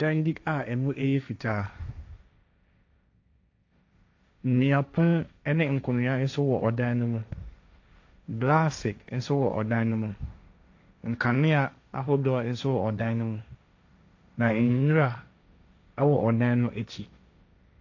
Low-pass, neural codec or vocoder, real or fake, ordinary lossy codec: 7.2 kHz; codec, 16 kHz, 1.1 kbps, Voila-Tokenizer; fake; AAC, 48 kbps